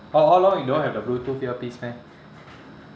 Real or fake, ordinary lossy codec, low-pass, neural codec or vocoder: real; none; none; none